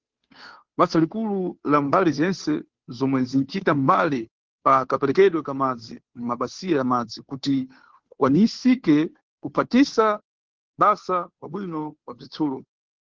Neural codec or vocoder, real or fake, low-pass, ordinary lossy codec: codec, 16 kHz, 2 kbps, FunCodec, trained on Chinese and English, 25 frames a second; fake; 7.2 kHz; Opus, 16 kbps